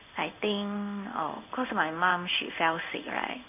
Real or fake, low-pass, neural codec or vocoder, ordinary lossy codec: fake; 3.6 kHz; codec, 16 kHz in and 24 kHz out, 1 kbps, XY-Tokenizer; none